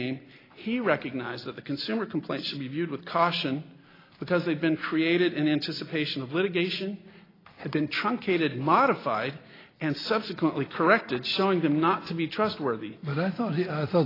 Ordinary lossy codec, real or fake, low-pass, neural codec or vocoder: AAC, 24 kbps; real; 5.4 kHz; none